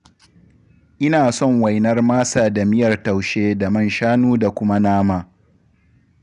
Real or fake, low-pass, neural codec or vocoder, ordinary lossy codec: real; 10.8 kHz; none; none